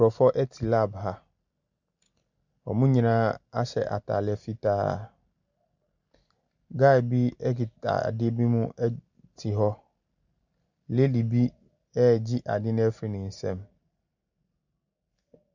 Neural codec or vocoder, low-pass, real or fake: none; 7.2 kHz; real